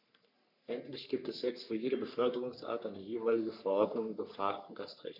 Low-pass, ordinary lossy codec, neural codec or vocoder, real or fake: 5.4 kHz; none; codec, 44.1 kHz, 3.4 kbps, Pupu-Codec; fake